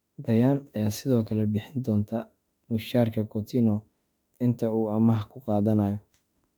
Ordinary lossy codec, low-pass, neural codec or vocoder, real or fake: none; 19.8 kHz; autoencoder, 48 kHz, 32 numbers a frame, DAC-VAE, trained on Japanese speech; fake